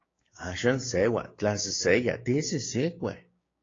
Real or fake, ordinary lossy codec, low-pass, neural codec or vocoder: fake; AAC, 32 kbps; 7.2 kHz; codec, 16 kHz, 6 kbps, DAC